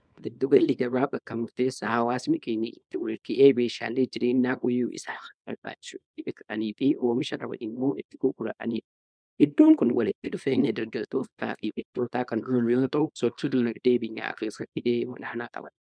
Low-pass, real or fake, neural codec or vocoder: 9.9 kHz; fake; codec, 24 kHz, 0.9 kbps, WavTokenizer, small release